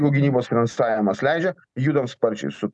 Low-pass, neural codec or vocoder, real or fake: 10.8 kHz; none; real